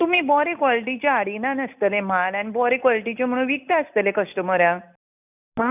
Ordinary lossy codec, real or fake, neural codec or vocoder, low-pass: none; real; none; 3.6 kHz